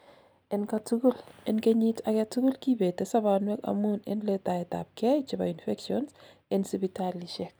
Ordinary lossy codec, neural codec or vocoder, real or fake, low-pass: none; none; real; none